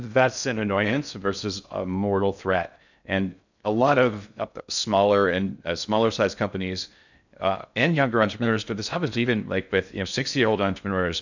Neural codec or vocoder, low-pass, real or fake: codec, 16 kHz in and 24 kHz out, 0.6 kbps, FocalCodec, streaming, 2048 codes; 7.2 kHz; fake